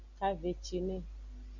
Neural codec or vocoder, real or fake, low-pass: none; real; 7.2 kHz